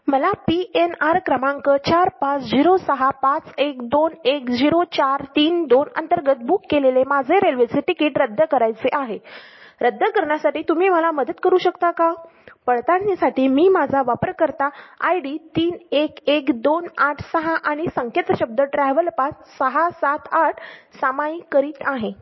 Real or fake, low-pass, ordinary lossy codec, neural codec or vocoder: real; 7.2 kHz; MP3, 24 kbps; none